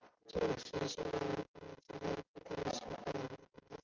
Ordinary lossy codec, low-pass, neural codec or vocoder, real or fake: Opus, 32 kbps; 7.2 kHz; none; real